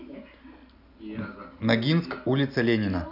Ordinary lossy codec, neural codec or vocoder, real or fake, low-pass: none; none; real; 5.4 kHz